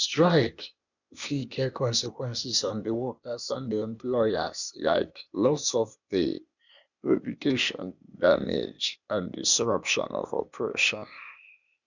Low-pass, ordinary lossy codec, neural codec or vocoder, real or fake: 7.2 kHz; none; codec, 16 kHz, 0.8 kbps, ZipCodec; fake